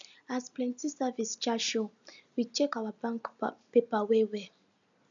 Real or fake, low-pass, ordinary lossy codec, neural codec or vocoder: real; 7.2 kHz; none; none